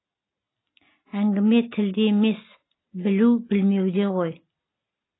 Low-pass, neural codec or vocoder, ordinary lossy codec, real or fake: 7.2 kHz; none; AAC, 16 kbps; real